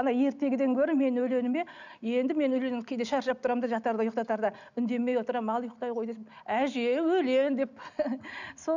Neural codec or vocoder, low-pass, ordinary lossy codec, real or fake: none; 7.2 kHz; none; real